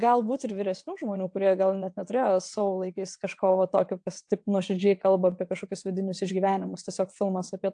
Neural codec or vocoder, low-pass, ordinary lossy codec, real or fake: vocoder, 22.05 kHz, 80 mel bands, WaveNeXt; 9.9 kHz; AAC, 64 kbps; fake